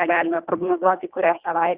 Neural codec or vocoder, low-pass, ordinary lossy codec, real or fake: codec, 24 kHz, 1.5 kbps, HILCodec; 3.6 kHz; Opus, 64 kbps; fake